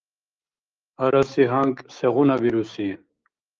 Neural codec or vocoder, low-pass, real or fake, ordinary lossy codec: codec, 16 kHz, 6 kbps, DAC; 7.2 kHz; fake; Opus, 24 kbps